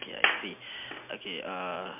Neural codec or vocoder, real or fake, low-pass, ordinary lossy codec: none; real; 3.6 kHz; MP3, 32 kbps